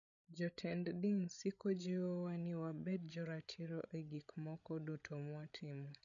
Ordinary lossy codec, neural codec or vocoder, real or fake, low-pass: none; codec, 16 kHz, 16 kbps, FreqCodec, larger model; fake; 7.2 kHz